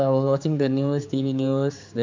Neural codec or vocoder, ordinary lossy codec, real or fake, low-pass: codec, 16 kHz, 4 kbps, X-Codec, HuBERT features, trained on general audio; MP3, 64 kbps; fake; 7.2 kHz